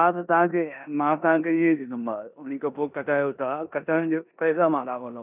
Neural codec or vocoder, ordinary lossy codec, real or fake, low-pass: codec, 16 kHz in and 24 kHz out, 0.9 kbps, LongCat-Audio-Codec, four codebook decoder; none; fake; 3.6 kHz